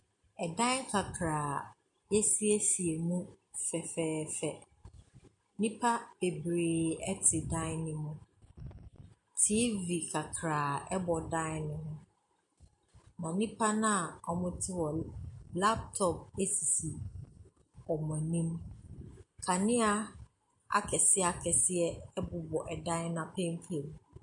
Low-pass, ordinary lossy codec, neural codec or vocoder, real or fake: 10.8 kHz; MP3, 48 kbps; none; real